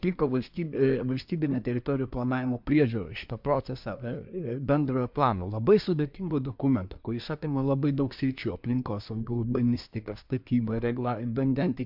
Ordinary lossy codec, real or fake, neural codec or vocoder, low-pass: MP3, 48 kbps; fake; codec, 24 kHz, 1 kbps, SNAC; 5.4 kHz